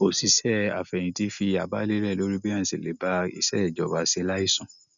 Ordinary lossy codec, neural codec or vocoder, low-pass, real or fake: none; none; 7.2 kHz; real